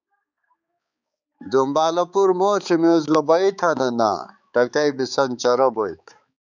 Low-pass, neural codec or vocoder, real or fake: 7.2 kHz; codec, 16 kHz, 4 kbps, X-Codec, HuBERT features, trained on balanced general audio; fake